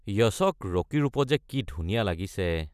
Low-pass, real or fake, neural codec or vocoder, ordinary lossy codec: 14.4 kHz; real; none; none